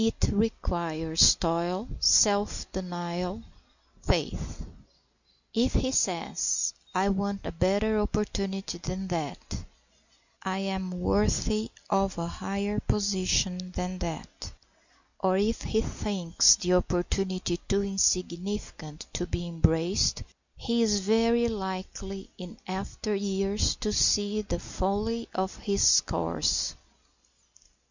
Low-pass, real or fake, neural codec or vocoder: 7.2 kHz; real; none